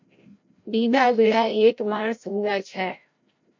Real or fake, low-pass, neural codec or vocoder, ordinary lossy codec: fake; 7.2 kHz; codec, 16 kHz, 0.5 kbps, FreqCodec, larger model; MP3, 48 kbps